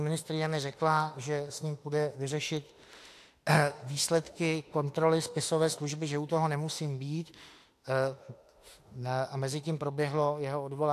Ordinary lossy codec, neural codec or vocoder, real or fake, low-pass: AAC, 64 kbps; autoencoder, 48 kHz, 32 numbers a frame, DAC-VAE, trained on Japanese speech; fake; 14.4 kHz